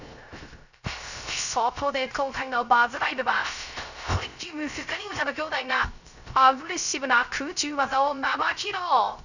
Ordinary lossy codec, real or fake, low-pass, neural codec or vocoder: none; fake; 7.2 kHz; codec, 16 kHz, 0.3 kbps, FocalCodec